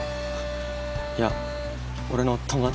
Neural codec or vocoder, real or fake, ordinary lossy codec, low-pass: none; real; none; none